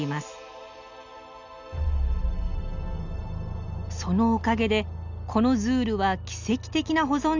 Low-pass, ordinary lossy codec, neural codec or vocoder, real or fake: 7.2 kHz; none; none; real